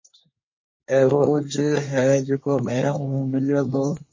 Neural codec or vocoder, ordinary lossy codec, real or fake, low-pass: codec, 24 kHz, 1 kbps, SNAC; MP3, 32 kbps; fake; 7.2 kHz